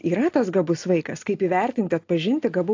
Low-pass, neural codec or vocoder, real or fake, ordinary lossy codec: 7.2 kHz; none; real; AAC, 48 kbps